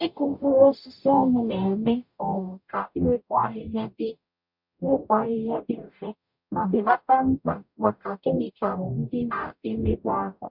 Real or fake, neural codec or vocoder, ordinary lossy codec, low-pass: fake; codec, 44.1 kHz, 0.9 kbps, DAC; MP3, 48 kbps; 5.4 kHz